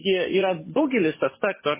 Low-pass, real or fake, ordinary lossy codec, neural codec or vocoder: 3.6 kHz; fake; MP3, 16 kbps; codec, 44.1 kHz, 7.8 kbps, DAC